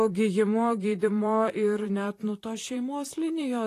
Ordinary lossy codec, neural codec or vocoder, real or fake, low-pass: AAC, 48 kbps; vocoder, 44.1 kHz, 128 mel bands, Pupu-Vocoder; fake; 14.4 kHz